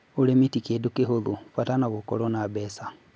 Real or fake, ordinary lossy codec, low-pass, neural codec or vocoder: real; none; none; none